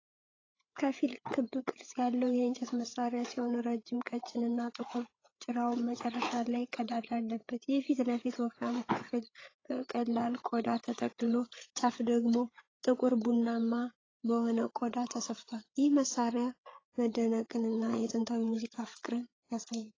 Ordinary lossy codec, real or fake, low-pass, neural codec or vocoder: AAC, 32 kbps; fake; 7.2 kHz; codec, 16 kHz, 8 kbps, FreqCodec, larger model